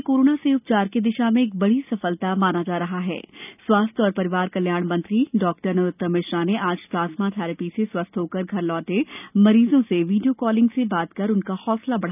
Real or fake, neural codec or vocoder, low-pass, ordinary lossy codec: real; none; 3.6 kHz; none